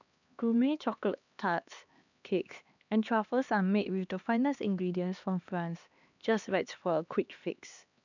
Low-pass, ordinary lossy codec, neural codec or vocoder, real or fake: 7.2 kHz; none; codec, 16 kHz, 2 kbps, X-Codec, HuBERT features, trained on LibriSpeech; fake